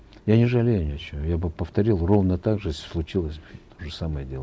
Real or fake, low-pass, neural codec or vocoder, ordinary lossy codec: real; none; none; none